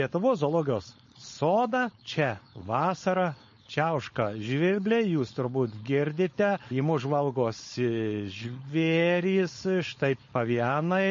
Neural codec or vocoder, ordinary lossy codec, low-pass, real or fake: codec, 16 kHz, 4.8 kbps, FACodec; MP3, 32 kbps; 7.2 kHz; fake